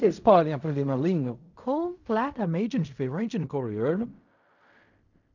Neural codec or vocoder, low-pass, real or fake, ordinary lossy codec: codec, 16 kHz in and 24 kHz out, 0.4 kbps, LongCat-Audio-Codec, fine tuned four codebook decoder; 7.2 kHz; fake; none